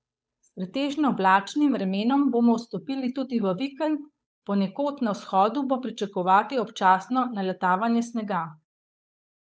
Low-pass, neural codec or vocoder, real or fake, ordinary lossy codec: none; codec, 16 kHz, 8 kbps, FunCodec, trained on Chinese and English, 25 frames a second; fake; none